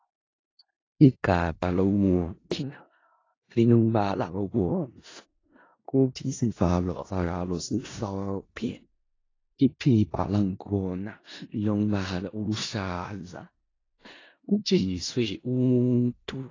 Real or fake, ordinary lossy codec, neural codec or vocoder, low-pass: fake; AAC, 32 kbps; codec, 16 kHz in and 24 kHz out, 0.4 kbps, LongCat-Audio-Codec, four codebook decoder; 7.2 kHz